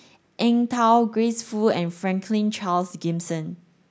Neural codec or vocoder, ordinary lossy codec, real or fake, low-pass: none; none; real; none